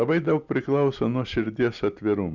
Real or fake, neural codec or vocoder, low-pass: real; none; 7.2 kHz